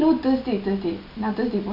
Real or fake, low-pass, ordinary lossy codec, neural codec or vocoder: real; 5.4 kHz; none; none